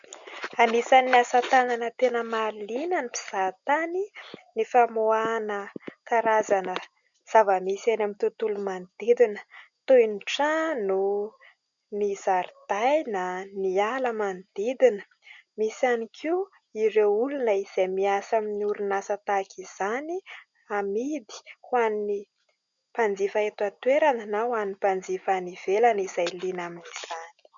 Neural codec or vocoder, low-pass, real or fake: none; 7.2 kHz; real